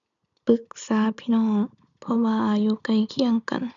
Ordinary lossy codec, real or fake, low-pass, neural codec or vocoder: none; real; 7.2 kHz; none